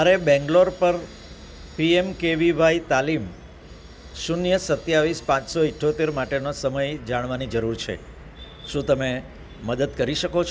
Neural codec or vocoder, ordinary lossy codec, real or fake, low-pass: none; none; real; none